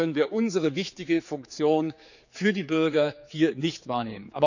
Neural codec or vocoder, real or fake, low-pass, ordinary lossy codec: codec, 16 kHz, 4 kbps, X-Codec, HuBERT features, trained on general audio; fake; 7.2 kHz; none